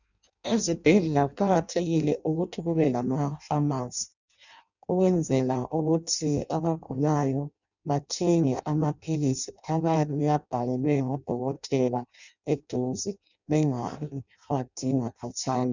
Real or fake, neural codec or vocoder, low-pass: fake; codec, 16 kHz in and 24 kHz out, 0.6 kbps, FireRedTTS-2 codec; 7.2 kHz